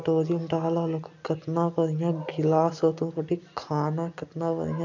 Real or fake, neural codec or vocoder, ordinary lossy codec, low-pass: real; none; none; 7.2 kHz